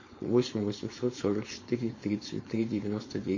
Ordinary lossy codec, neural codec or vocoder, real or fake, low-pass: MP3, 32 kbps; codec, 16 kHz, 4.8 kbps, FACodec; fake; 7.2 kHz